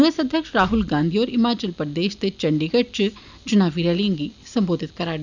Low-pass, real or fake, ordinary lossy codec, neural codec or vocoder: 7.2 kHz; real; none; none